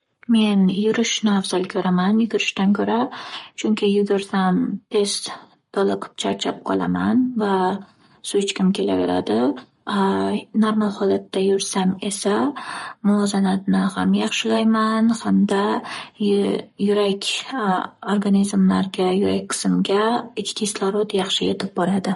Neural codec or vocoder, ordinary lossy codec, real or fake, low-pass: codec, 44.1 kHz, 7.8 kbps, Pupu-Codec; MP3, 48 kbps; fake; 19.8 kHz